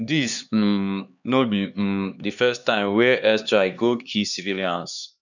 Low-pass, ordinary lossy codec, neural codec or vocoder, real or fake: 7.2 kHz; none; codec, 16 kHz, 2 kbps, X-Codec, HuBERT features, trained on LibriSpeech; fake